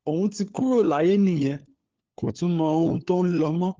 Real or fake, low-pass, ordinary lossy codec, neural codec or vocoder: fake; 7.2 kHz; Opus, 16 kbps; codec, 16 kHz, 4 kbps, FunCodec, trained on LibriTTS, 50 frames a second